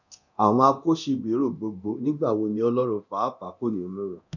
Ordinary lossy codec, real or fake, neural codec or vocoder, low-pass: none; fake; codec, 24 kHz, 0.9 kbps, DualCodec; 7.2 kHz